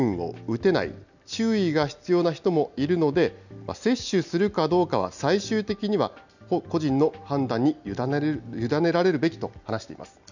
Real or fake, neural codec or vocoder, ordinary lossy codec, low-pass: real; none; none; 7.2 kHz